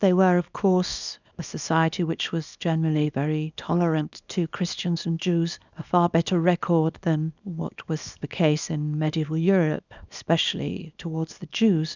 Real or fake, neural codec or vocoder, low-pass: fake; codec, 24 kHz, 0.9 kbps, WavTokenizer, small release; 7.2 kHz